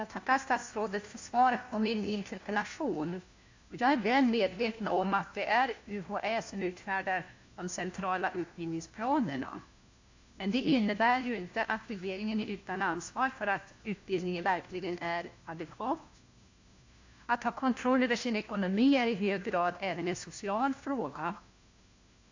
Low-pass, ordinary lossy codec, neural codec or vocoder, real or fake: 7.2 kHz; AAC, 48 kbps; codec, 16 kHz, 1 kbps, FunCodec, trained on LibriTTS, 50 frames a second; fake